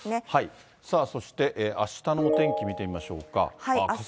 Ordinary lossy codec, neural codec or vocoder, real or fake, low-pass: none; none; real; none